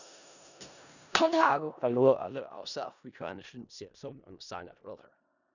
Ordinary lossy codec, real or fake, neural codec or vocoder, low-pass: none; fake; codec, 16 kHz in and 24 kHz out, 0.4 kbps, LongCat-Audio-Codec, four codebook decoder; 7.2 kHz